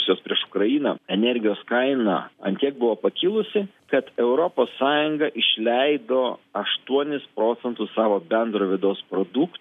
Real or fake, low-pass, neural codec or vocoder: real; 14.4 kHz; none